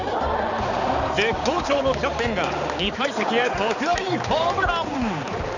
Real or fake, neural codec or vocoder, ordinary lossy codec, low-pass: fake; codec, 16 kHz, 4 kbps, X-Codec, HuBERT features, trained on balanced general audio; none; 7.2 kHz